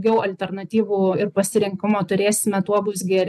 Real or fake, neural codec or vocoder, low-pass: real; none; 14.4 kHz